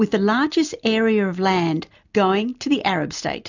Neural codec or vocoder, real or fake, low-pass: none; real; 7.2 kHz